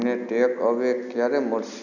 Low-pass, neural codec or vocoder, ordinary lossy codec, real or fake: 7.2 kHz; none; none; real